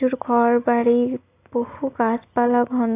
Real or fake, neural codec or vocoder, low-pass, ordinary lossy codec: real; none; 3.6 kHz; AAC, 24 kbps